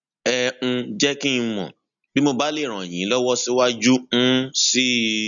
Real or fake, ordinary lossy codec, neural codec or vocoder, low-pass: real; none; none; 7.2 kHz